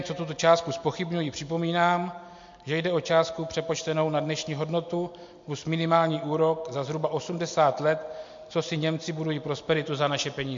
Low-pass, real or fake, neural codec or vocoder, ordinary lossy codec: 7.2 kHz; real; none; MP3, 48 kbps